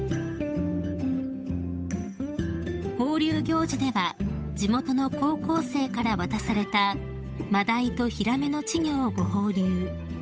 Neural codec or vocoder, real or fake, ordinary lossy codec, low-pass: codec, 16 kHz, 8 kbps, FunCodec, trained on Chinese and English, 25 frames a second; fake; none; none